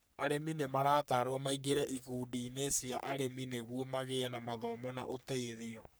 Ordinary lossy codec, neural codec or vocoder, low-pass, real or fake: none; codec, 44.1 kHz, 3.4 kbps, Pupu-Codec; none; fake